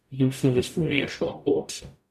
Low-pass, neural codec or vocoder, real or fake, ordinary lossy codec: 14.4 kHz; codec, 44.1 kHz, 0.9 kbps, DAC; fake; MP3, 96 kbps